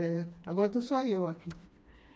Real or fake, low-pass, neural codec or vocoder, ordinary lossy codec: fake; none; codec, 16 kHz, 2 kbps, FreqCodec, smaller model; none